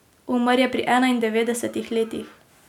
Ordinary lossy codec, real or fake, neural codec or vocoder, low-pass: none; real; none; 19.8 kHz